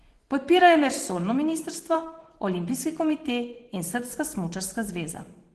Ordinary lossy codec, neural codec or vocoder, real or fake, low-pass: Opus, 16 kbps; vocoder, 24 kHz, 100 mel bands, Vocos; fake; 10.8 kHz